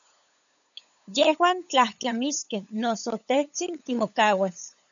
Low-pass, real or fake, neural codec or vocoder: 7.2 kHz; fake; codec, 16 kHz, 8 kbps, FunCodec, trained on LibriTTS, 25 frames a second